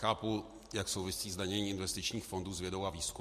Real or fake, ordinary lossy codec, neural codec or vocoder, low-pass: real; MP3, 64 kbps; none; 14.4 kHz